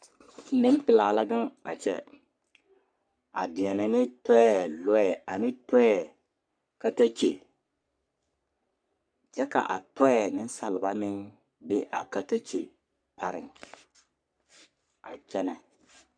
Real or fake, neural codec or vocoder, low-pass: fake; codec, 44.1 kHz, 3.4 kbps, Pupu-Codec; 9.9 kHz